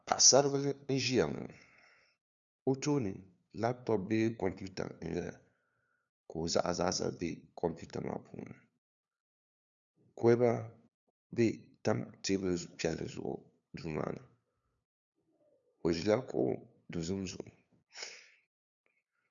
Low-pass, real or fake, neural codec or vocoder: 7.2 kHz; fake; codec, 16 kHz, 2 kbps, FunCodec, trained on LibriTTS, 25 frames a second